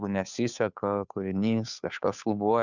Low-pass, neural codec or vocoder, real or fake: 7.2 kHz; codec, 16 kHz, 2 kbps, FunCodec, trained on Chinese and English, 25 frames a second; fake